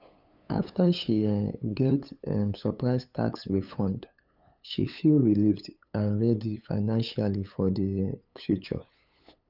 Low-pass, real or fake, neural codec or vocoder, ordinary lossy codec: 5.4 kHz; fake; codec, 16 kHz, 8 kbps, FunCodec, trained on LibriTTS, 25 frames a second; none